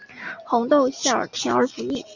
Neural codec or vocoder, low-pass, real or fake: none; 7.2 kHz; real